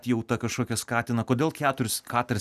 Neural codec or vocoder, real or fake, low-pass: none; real; 14.4 kHz